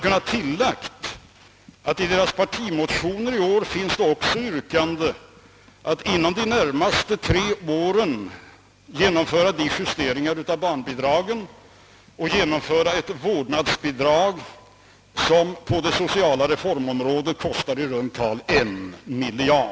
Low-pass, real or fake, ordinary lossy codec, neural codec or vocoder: none; real; none; none